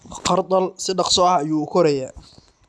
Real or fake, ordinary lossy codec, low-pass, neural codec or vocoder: real; none; none; none